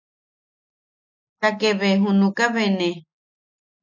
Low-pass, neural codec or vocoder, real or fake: 7.2 kHz; none; real